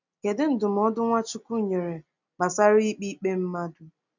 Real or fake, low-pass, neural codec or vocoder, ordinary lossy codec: real; 7.2 kHz; none; none